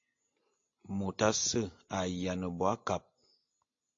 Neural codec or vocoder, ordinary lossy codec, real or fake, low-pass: none; AAC, 48 kbps; real; 7.2 kHz